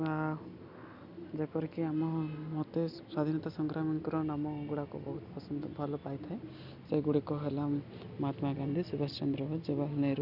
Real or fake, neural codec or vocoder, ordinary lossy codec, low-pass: real; none; none; 5.4 kHz